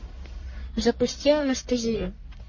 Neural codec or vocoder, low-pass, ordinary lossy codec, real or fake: codec, 44.1 kHz, 1.7 kbps, Pupu-Codec; 7.2 kHz; MP3, 32 kbps; fake